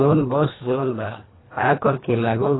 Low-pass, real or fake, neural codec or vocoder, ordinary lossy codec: 7.2 kHz; fake; codec, 24 kHz, 1.5 kbps, HILCodec; AAC, 16 kbps